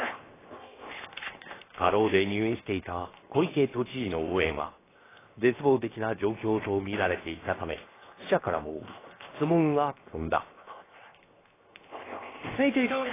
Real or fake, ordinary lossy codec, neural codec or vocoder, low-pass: fake; AAC, 16 kbps; codec, 16 kHz, 0.7 kbps, FocalCodec; 3.6 kHz